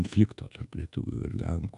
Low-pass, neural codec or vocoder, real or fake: 10.8 kHz; codec, 24 kHz, 1.2 kbps, DualCodec; fake